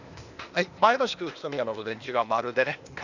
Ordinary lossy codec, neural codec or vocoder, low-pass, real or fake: none; codec, 16 kHz, 0.8 kbps, ZipCodec; 7.2 kHz; fake